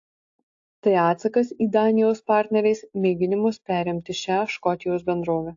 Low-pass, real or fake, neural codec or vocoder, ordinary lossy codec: 7.2 kHz; real; none; AAC, 48 kbps